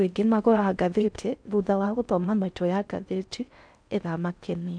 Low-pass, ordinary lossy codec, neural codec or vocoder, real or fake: 9.9 kHz; none; codec, 16 kHz in and 24 kHz out, 0.6 kbps, FocalCodec, streaming, 4096 codes; fake